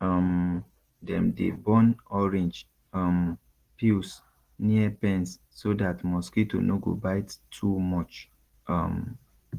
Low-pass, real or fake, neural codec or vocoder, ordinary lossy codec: 14.4 kHz; real; none; Opus, 16 kbps